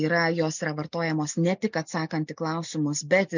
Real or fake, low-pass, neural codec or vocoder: real; 7.2 kHz; none